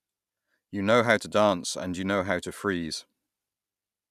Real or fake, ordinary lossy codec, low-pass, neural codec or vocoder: real; none; 14.4 kHz; none